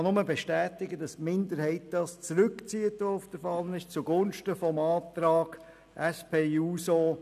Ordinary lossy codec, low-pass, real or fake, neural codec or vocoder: none; 14.4 kHz; real; none